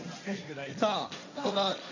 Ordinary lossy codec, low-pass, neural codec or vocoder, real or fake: none; 7.2 kHz; codec, 16 kHz, 1.1 kbps, Voila-Tokenizer; fake